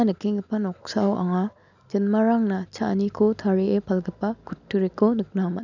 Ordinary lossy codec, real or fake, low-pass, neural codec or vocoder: none; real; 7.2 kHz; none